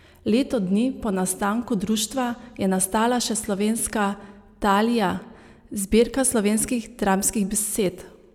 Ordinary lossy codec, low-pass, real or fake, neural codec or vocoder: none; 19.8 kHz; real; none